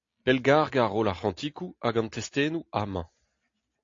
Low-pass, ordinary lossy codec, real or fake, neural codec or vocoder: 7.2 kHz; AAC, 48 kbps; real; none